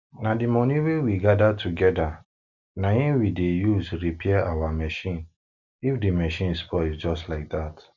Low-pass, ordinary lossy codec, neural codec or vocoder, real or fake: 7.2 kHz; none; none; real